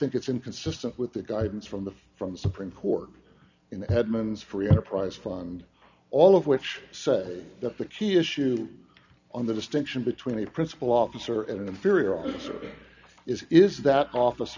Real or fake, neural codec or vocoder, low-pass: real; none; 7.2 kHz